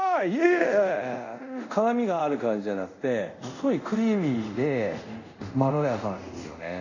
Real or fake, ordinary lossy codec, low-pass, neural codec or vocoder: fake; none; 7.2 kHz; codec, 24 kHz, 0.5 kbps, DualCodec